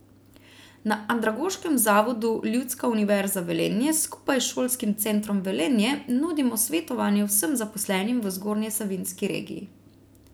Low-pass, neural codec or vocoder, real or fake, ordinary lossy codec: none; none; real; none